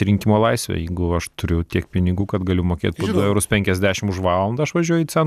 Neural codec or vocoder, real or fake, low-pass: none; real; 14.4 kHz